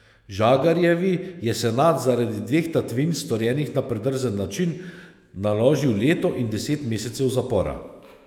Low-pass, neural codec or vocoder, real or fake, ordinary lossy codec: 19.8 kHz; autoencoder, 48 kHz, 128 numbers a frame, DAC-VAE, trained on Japanese speech; fake; none